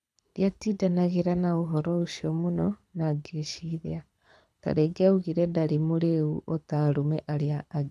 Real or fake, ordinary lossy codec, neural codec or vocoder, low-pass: fake; none; codec, 24 kHz, 6 kbps, HILCodec; none